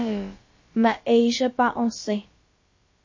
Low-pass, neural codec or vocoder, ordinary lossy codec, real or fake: 7.2 kHz; codec, 16 kHz, about 1 kbps, DyCAST, with the encoder's durations; MP3, 32 kbps; fake